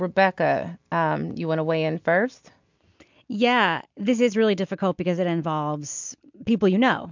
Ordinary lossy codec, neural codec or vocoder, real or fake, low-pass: MP3, 64 kbps; none; real; 7.2 kHz